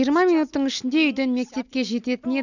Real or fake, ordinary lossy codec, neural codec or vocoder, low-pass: real; none; none; 7.2 kHz